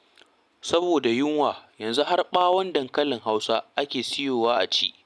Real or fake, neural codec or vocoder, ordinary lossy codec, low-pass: real; none; none; none